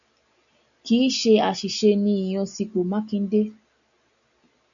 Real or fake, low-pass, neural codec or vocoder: real; 7.2 kHz; none